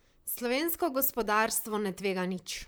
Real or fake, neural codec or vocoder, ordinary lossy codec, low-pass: fake; vocoder, 44.1 kHz, 128 mel bands, Pupu-Vocoder; none; none